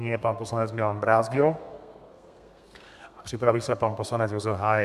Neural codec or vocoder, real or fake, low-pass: codec, 32 kHz, 1.9 kbps, SNAC; fake; 14.4 kHz